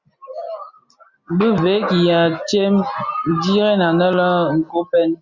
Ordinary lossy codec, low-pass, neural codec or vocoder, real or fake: Opus, 64 kbps; 7.2 kHz; none; real